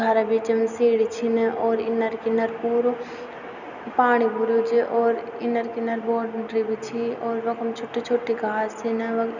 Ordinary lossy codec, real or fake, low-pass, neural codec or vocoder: none; real; 7.2 kHz; none